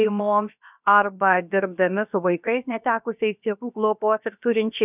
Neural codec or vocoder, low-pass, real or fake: codec, 16 kHz, about 1 kbps, DyCAST, with the encoder's durations; 3.6 kHz; fake